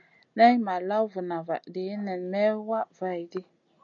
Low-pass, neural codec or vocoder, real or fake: 7.2 kHz; none; real